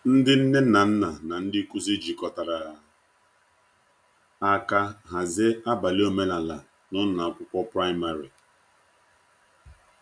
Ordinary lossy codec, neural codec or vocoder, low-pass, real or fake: none; none; 9.9 kHz; real